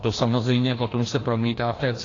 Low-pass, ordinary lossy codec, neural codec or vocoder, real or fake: 7.2 kHz; AAC, 32 kbps; codec, 16 kHz, 1 kbps, FreqCodec, larger model; fake